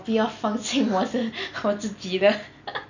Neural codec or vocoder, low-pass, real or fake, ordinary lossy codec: none; 7.2 kHz; real; none